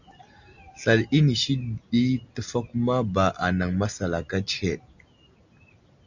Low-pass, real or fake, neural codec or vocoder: 7.2 kHz; real; none